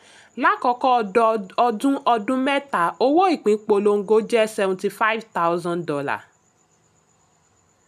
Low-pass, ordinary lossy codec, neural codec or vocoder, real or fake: 14.4 kHz; none; none; real